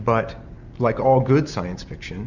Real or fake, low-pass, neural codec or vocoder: real; 7.2 kHz; none